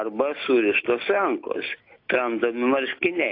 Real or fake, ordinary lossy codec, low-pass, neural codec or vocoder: real; AAC, 32 kbps; 5.4 kHz; none